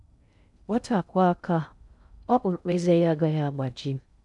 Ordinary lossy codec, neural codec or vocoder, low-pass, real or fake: none; codec, 16 kHz in and 24 kHz out, 0.6 kbps, FocalCodec, streaming, 2048 codes; 10.8 kHz; fake